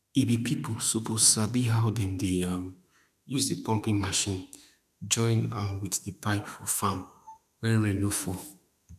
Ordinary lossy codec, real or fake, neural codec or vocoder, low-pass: none; fake; autoencoder, 48 kHz, 32 numbers a frame, DAC-VAE, trained on Japanese speech; 14.4 kHz